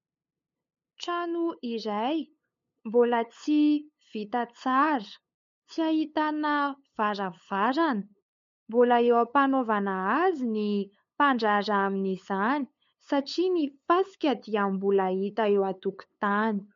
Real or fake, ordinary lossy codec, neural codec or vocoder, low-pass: fake; MP3, 48 kbps; codec, 16 kHz, 8 kbps, FunCodec, trained on LibriTTS, 25 frames a second; 7.2 kHz